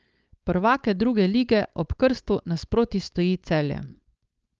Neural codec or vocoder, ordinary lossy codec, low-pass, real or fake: codec, 16 kHz, 4.8 kbps, FACodec; Opus, 24 kbps; 7.2 kHz; fake